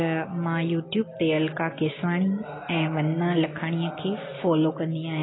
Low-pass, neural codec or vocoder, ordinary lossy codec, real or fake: 7.2 kHz; none; AAC, 16 kbps; real